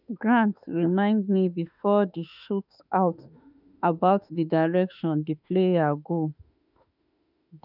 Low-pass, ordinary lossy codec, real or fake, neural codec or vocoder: 5.4 kHz; none; fake; autoencoder, 48 kHz, 32 numbers a frame, DAC-VAE, trained on Japanese speech